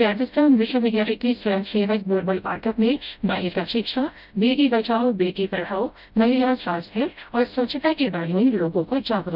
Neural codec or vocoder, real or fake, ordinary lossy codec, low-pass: codec, 16 kHz, 0.5 kbps, FreqCodec, smaller model; fake; none; 5.4 kHz